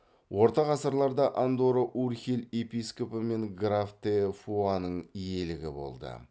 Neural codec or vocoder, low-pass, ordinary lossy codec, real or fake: none; none; none; real